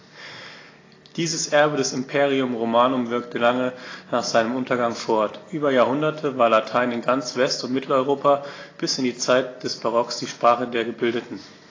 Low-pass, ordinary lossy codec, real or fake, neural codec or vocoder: 7.2 kHz; AAC, 32 kbps; real; none